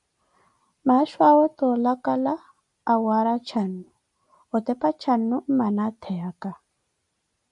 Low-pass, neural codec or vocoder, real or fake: 10.8 kHz; none; real